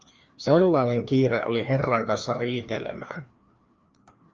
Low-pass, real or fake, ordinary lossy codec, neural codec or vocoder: 7.2 kHz; fake; Opus, 24 kbps; codec, 16 kHz, 2 kbps, FreqCodec, larger model